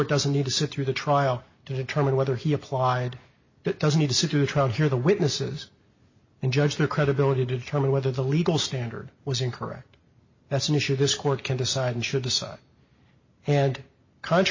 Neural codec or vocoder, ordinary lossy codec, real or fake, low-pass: none; MP3, 32 kbps; real; 7.2 kHz